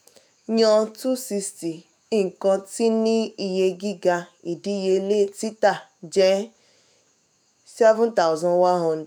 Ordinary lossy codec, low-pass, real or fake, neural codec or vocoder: none; none; fake; autoencoder, 48 kHz, 128 numbers a frame, DAC-VAE, trained on Japanese speech